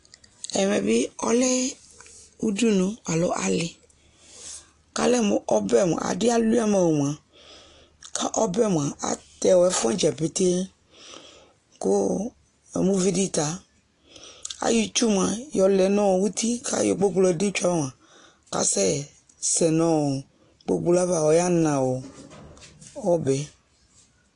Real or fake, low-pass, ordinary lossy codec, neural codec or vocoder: real; 10.8 kHz; AAC, 48 kbps; none